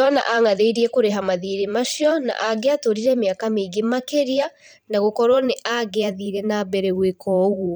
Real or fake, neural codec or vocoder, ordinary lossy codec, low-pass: fake; vocoder, 44.1 kHz, 128 mel bands every 512 samples, BigVGAN v2; none; none